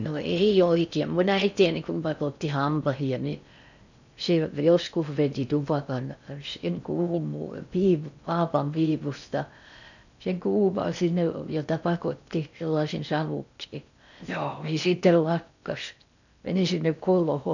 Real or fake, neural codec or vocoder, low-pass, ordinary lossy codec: fake; codec, 16 kHz in and 24 kHz out, 0.6 kbps, FocalCodec, streaming, 4096 codes; 7.2 kHz; none